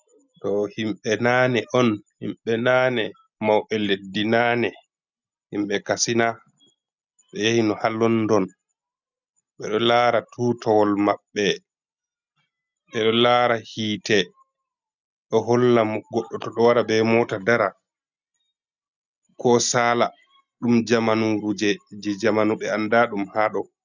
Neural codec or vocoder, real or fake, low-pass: none; real; 7.2 kHz